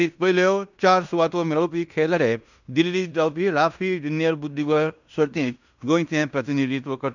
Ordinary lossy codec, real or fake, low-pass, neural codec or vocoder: none; fake; 7.2 kHz; codec, 16 kHz in and 24 kHz out, 0.9 kbps, LongCat-Audio-Codec, fine tuned four codebook decoder